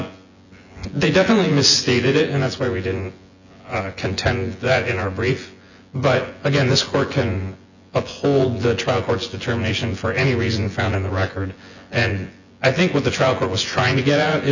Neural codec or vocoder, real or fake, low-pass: vocoder, 24 kHz, 100 mel bands, Vocos; fake; 7.2 kHz